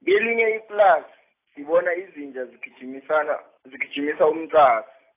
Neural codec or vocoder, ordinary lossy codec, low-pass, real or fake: none; AAC, 24 kbps; 3.6 kHz; real